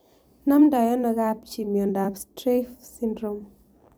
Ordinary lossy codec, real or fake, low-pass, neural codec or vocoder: none; fake; none; vocoder, 44.1 kHz, 128 mel bands every 256 samples, BigVGAN v2